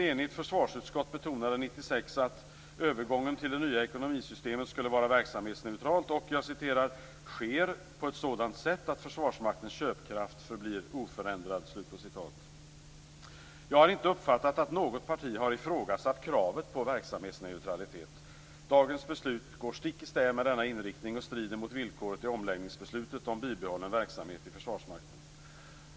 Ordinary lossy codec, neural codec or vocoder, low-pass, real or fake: none; none; none; real